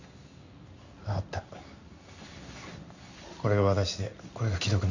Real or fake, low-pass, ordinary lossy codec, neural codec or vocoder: fake; 7.2 kHz; none; codec, 16 kHz in and 24 kHz out, 1 kbps, XY-Tokenizer